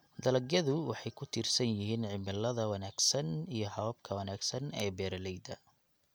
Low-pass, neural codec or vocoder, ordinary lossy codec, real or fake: none; none; none; real